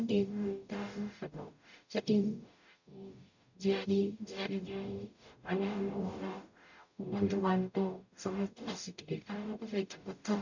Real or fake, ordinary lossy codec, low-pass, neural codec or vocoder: fake; none; 7.2 kHz; codec, 44.1 kHz, 0.9 kbps, DAC